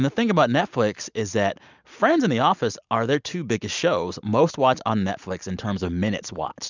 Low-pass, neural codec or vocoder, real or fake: 7.2 kHz; none; real